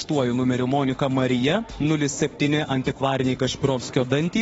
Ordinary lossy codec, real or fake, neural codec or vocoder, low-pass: AAC, 24 kbps; fake; autoencoder, 48 kHz, 32 numbers a frame, DAC-VAE, trained on Japanese speech; 19.8 kHz